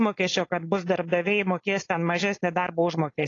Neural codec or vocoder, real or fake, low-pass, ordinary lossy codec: codec, 16 kHz, 4.8 kbps, FACodec; fake; 7.2 kHz; AAC, 32 kbps